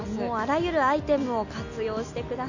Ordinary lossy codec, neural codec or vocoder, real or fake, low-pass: none; none; real; 7.2 kHz